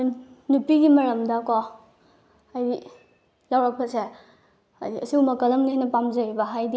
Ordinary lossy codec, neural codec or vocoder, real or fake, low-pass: none; none; real; none